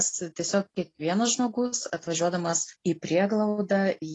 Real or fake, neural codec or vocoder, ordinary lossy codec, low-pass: real; none; AAC, 32 kbps; 10.8 kHz